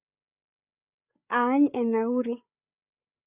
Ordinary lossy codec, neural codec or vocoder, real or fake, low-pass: none; codec, 16 kHz, 8 kbps, FreqCodec, larger model; fake; 3.6 kHz